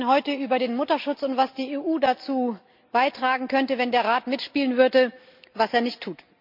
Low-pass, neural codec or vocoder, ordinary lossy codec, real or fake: 5.4 kHz; none; MP3, 48 kbps; real